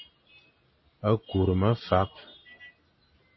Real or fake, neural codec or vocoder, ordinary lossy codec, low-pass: real; none; MP3, 24 kbps; 7.2 kHz